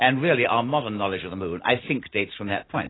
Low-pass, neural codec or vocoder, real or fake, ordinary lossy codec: 7.2 kHz; none; real; AAC, 16 kbps